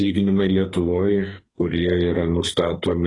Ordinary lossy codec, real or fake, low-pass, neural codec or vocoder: AAC, 32 kbps; fake; 10.8 kHz; codec, 44.1 kHz, 2.6 kbps, SNAC